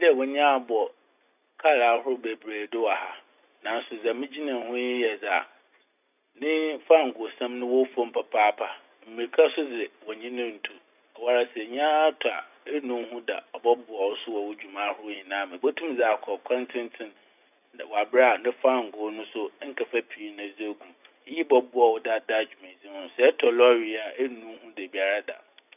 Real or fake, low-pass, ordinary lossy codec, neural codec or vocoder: real; 3.6 kHz; none; none